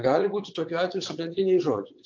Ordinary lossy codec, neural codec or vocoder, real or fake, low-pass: AAC, 48 kbps; vocoder, 22.05 kHz, 80 mel bands, WaveNeXt; fake; 7.2 kHz